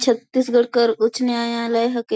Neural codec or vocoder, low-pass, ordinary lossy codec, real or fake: none; none; none; real